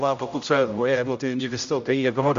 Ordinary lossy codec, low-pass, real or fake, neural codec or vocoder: Opus, 64 kbps; 7.2 kHz; fake; codec, 16 kHz, 0.5 kbps, X-Codec, HuBERT features, trained on general audio